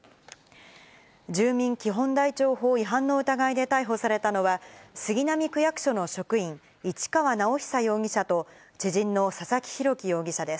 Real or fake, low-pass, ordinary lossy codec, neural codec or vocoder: real; none; none; none